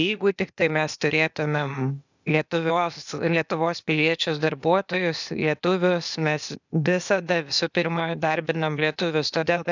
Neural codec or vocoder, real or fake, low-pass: codec, 16 kHz, 0.8 kbps, ZipCodec; fake; 7.2 kHz